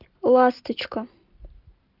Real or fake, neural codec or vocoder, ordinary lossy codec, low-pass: real; none; Opus, 24 kbps; 5.4 kHz